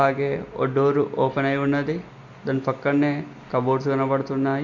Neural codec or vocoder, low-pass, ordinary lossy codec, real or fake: none; 7.2 kHz; none; real